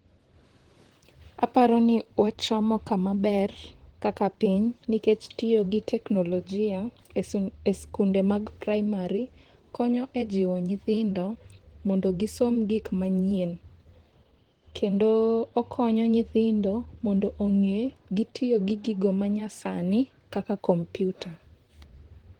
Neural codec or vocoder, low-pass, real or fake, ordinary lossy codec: vocoder, 44.1 kHz, 128 mel bands, Pupu-Vocoder; 19.8 kHz; fake; Opus, 16 kbps